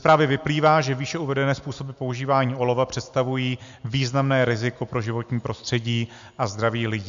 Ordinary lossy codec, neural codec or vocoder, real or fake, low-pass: MP3, 64 kbps; none; real; 7.2 kHz